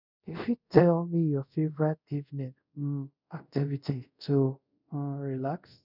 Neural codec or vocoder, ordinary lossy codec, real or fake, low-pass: codec, 24 kHz, 0.5 kbps, DualCodec; none; fake; 5.4 kHz